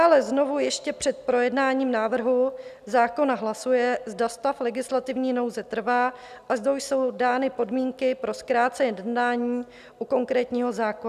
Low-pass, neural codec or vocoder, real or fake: 14.4 kHz; none; real